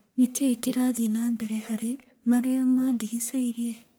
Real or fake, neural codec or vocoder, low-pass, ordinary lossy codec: fake; codec, 44.1 kHz, 1.7 kbps, Pupu-Codec; none; none